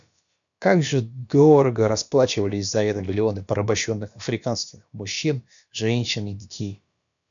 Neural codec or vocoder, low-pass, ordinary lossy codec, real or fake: codec, 16 kHz, about 1 kbps, DyCAST, with the encoder's durations; 7.2 kHz; MP3, 96 kbps; fake